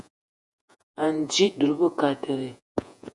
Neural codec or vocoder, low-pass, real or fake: vocoder, 48 kHz, 128 mel bands, Vocos; 10.8 kHz; fake